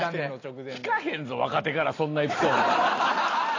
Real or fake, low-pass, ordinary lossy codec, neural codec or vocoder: real; 7.2 kHz; AAC, 32 kbps; none